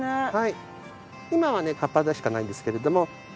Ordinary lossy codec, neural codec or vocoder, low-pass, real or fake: none; none; none; real